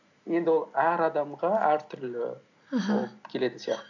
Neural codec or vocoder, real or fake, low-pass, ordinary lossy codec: none; real; 7.2 kHz; none